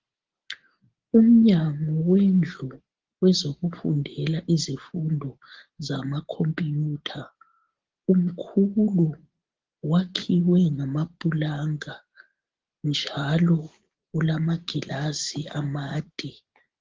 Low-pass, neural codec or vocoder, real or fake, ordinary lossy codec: 7.2 kHz; none; real; Opus, 16 kbps